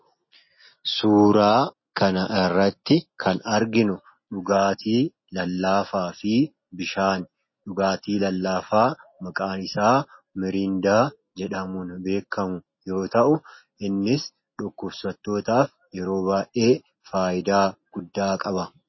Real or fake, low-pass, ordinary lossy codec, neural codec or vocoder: real; 7.2 kHz; MP3, 24 kbps; none